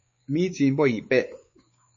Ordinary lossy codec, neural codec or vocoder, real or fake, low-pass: MP3, 32 kbps; codec, 16 kHz, 4 kbps, X-Codec, WavLM features, trained on Multilingual LibriSpeech; fake; 7.2 kHz